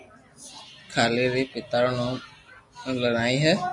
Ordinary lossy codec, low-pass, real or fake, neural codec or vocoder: MP3, 48 kbps; 10.8 kHz; real; none